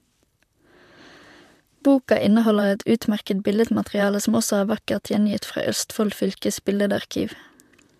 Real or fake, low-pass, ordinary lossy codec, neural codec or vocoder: fake; 14.4 kHz; MP3, 96 kbps; vocoder, 44.1 kHz, 128 mel bands, Pupu-Vocoder